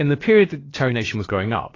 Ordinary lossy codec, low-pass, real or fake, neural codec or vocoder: AAC, 32 kbps; 7.2 kHz; fake; codec, 16 kHz, about 1 kbps, DyCAST, with the encoder's durations